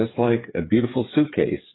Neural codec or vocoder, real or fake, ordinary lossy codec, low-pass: codec, 16 kHz, 8 kbps, FreqCodec, smaller model; fake; AAC, 16 kbps; 7.2 kHz